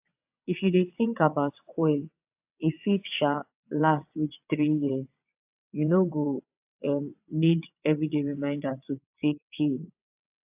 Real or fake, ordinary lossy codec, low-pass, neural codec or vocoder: fake; AAC, 32 kbps; 3.6 kHz; vocoder, 22.05 kHz, 80 mel bands, WaveNeXt